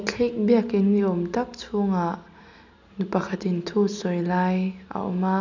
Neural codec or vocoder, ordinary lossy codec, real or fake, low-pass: none; none; real; 7.2 kHz